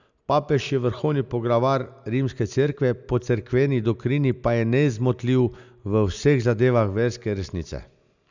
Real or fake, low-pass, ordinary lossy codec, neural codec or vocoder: real; 7.2 kHz; none; none